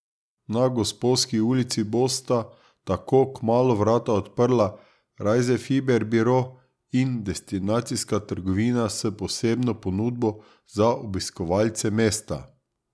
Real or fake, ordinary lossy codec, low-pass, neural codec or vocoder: real; none; none; none